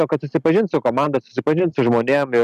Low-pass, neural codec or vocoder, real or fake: 14.4 kHz; none; real